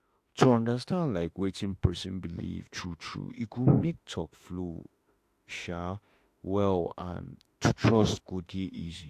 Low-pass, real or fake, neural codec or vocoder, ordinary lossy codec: 14.4 kHz; fake; autoencoder, 48 kHz, 32 numbers a frame, DAC-VAE, trained on Japanese speech; AAC, 64 kbps